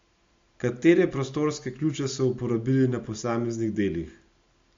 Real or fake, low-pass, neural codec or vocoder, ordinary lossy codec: real; 7.2 kHz; none; MP3, 48 kbps